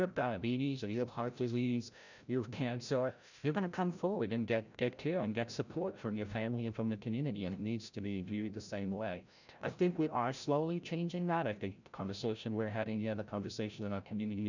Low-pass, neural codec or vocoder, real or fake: 7.2 kHz; codec, 16 kHz, 0.5 kbps, FreqCodec, larger model; fake